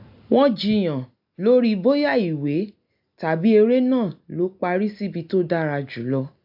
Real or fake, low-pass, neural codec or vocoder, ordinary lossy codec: real; 5.4 kHz; none; AAC, 48 kbps